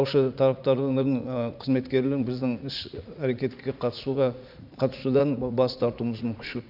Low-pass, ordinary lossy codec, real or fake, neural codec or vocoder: 5.4 kHz; none; fake; vocoder, 44.1 kHz, 80 mel bands, Vocos